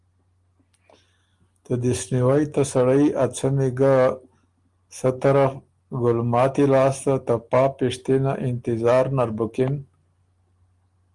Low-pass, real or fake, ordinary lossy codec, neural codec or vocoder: 10.8 kHz; real; Opus, 24 kbps; none